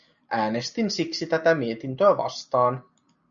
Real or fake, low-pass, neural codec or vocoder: real; 7.2 kHz; none